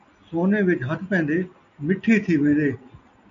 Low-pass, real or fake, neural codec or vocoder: 7.2 kHz; real; none